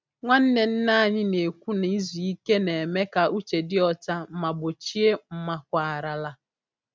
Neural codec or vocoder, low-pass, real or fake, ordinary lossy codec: none; none; real; none